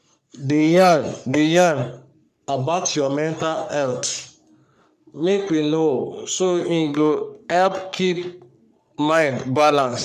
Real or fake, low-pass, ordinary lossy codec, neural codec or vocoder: fake; 14.4 kHz; none; codec, 44.1 kHz, 3.4 kbps, Pupu-Codec